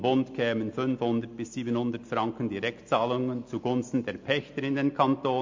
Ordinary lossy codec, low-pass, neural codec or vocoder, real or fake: MP3, 48 kbps; 7.2 kHz; none; real